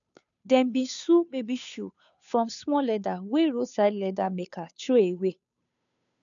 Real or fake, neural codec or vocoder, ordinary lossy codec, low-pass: fake; codec, 16 kHz, 2 kbps, FunCodec, trained on Chinese and English, 25 frames a second; none; 7.2 kHz